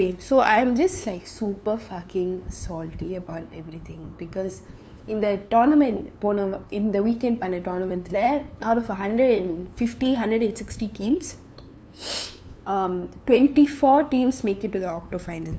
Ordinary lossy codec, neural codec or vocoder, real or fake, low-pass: none; codec, 16 kHz, 2 kbps, FunCodec, trained on LibriTTS, 25 frames a second; fake; none